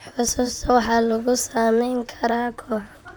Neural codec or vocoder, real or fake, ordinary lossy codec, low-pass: vocoder, 44.1 kHz, 128 mel bands, Pupu-Vocoder; fake; none; none